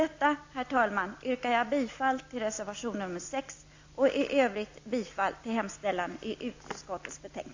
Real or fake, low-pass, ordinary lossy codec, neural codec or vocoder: real; 7.2 kHz; AAC, 48 kbps; none